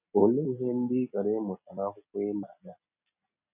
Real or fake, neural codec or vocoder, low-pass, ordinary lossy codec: real; none; 3.6 kHz; none